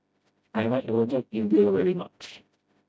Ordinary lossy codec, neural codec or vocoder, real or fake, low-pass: none; codec, 16 kHz, 0.5 kbps, FreqCodec, smaller model; fake; none